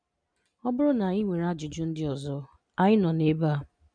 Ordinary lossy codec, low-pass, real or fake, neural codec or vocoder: none; 9.9 kHz; real; none